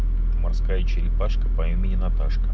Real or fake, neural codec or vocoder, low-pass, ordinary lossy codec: real; none; none; none